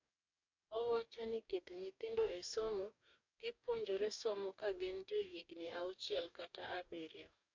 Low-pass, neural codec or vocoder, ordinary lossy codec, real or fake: 7.2 kHz; codec, 44.1 kHz, 2.6 kbps, DAC; MP3, 48 kbps; fake